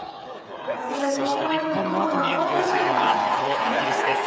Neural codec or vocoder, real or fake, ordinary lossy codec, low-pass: codec, 16 kHz, 8 kbps, FreqCodec, smaller model; fake; none; none